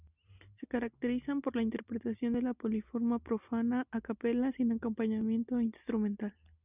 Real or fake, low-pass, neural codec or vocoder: real; 3.6 kHz; none